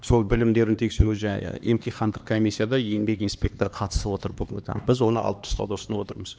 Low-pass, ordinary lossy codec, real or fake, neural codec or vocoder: none; none; fake; codec, 16 kHz, 2 kbps, X-Codec, HuBERT features, trained on LibriSpeech